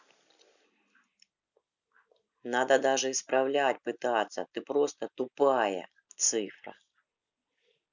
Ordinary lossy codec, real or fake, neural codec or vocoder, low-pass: none; real; none; 7.2 kHz